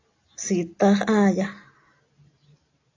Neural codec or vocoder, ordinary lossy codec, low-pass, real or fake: none; AAC, 32 kbps; 7.2 kHz; real